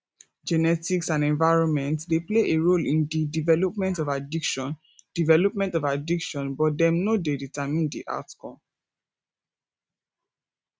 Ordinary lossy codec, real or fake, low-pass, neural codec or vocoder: none; real; none; none